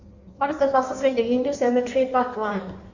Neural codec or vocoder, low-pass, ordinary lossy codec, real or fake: codec, 16 kHz in and 24 kHz out, 1.1 kbps, FireRedTTS-2 codec; 7.2 kHz; none; fake